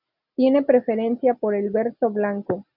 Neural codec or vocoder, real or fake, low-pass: none; real; 5.4 kHz